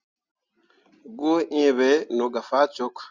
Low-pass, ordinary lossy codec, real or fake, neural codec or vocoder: 7.2 kHz; Opus, 64 kbps; real; none